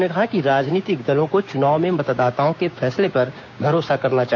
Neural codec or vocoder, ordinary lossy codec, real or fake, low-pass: codec, 16 kHz, 6 kbps, DAC; none; fake; 7.2 kHz